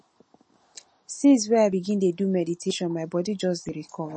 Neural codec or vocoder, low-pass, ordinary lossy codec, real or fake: none; 9.9 kHz; MP3, 32 kbps; real